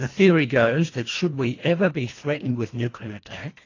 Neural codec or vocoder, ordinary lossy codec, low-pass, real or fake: codec, 24 kHz, 1.5 kbps, HILCodec; AAC, 32 kbps; 7.2 kHz; fake